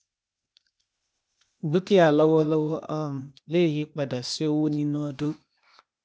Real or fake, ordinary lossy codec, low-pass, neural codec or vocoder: fake; none; none; codec, 16 kHz, 0.8 kbps, ZipCodec